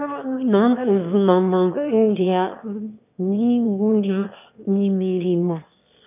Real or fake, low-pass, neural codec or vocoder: fake; 3.6 kHz; autoencoder, 22.05 kHz, a latent of 192 numbers a frame, VITS, trained on one speaker